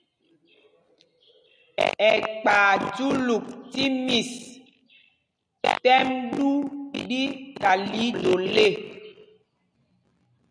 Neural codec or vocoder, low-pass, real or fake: none; 9.9 kHz; real